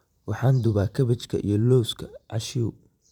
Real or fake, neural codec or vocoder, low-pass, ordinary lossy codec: fake; vocoder, 44.1 kHz, 128 mel bands every 512 samples, BigVGAN v2; 19.8 kHz; none